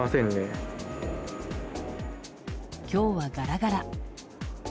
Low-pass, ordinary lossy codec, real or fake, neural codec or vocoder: none; none; real; none